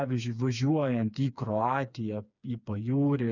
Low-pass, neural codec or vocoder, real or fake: 7.2 kHz; codec, 16 kHz, 4 kbps, FreqCodec, smaller model; fake